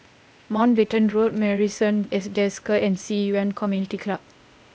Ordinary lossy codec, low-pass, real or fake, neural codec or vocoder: none; none; fake; codec, 16 kHz, 0.8 kbps, ZipCodec